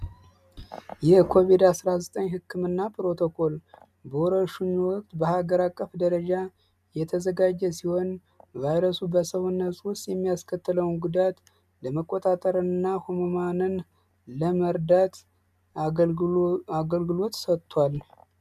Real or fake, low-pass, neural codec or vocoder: real; 14.4 kHz; none